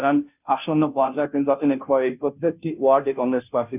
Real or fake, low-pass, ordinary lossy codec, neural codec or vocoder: fake; 3.6 kHz; none; codec, 16 kHz, 0.5 kbps, FunCodec, trained on Chinese and English, 25 frames a second